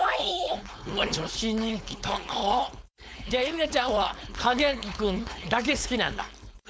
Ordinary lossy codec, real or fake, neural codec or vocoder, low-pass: none; fake; codec, 16 kHz, 4.8 kbps, FACodec; none